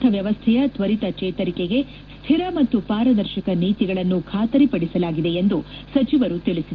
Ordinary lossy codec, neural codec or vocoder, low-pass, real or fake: Opus, 16 kbps; none; 7.2 kHz; real